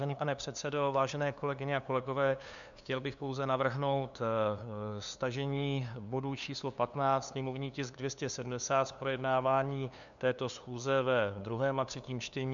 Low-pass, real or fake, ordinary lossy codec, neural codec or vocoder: 7.2 kHz; fake; MP3, 96 kbps; codec, 16 kHz, 2 kbps, FunCodec, trained on LibriTTS, 25 frames a second